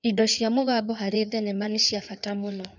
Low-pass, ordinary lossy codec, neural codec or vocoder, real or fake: 7.2 kHz; none; codec, 16 kHz in and 24 kHz out, 2.2 kbps, FireRedTTS-2 codec; fake